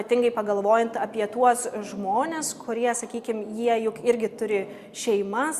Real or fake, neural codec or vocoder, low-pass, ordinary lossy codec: real; none; 14.4 kHz; Opus, 64 kbps